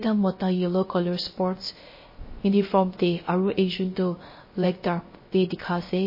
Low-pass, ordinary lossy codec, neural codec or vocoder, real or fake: 5.4 kHz; MP3, 24 kbps; codec, 16 kHz, 0.3 kbps, FocalCodec; fake